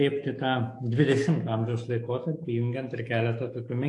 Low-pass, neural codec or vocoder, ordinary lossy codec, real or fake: 10.8 kHz; autoencoder, 48 kHz, 128 numbers a frame, DAC-VAE, trained on Japanese speech; AAC, 48 kbps; fake